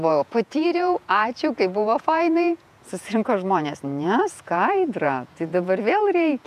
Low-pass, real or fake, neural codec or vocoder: 14.4 kHz; fake; vocoder, 48 kHz, 128 mel bands, Vocos